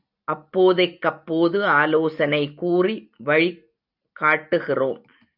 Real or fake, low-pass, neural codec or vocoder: real; 5.4 kHz; none